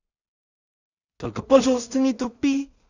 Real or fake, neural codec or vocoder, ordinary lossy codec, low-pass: fake; codec, 16 kHz in and 24 kHz out, 0.4 kbps, LongCat-Audio-Codec, two codebook decoder; none; 7.2 kHz